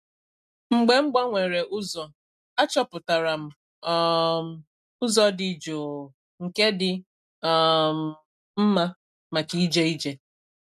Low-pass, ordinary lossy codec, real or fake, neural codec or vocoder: 14.4 kHz; none; real; none